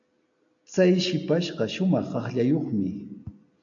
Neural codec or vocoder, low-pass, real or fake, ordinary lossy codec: none; 7.2 kHz; real; AAC, 64 kbps